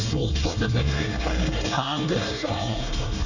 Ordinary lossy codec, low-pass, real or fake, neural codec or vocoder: none; 7.2 kHz; fake; codec, 24 kHz, 1 kbps, SNAC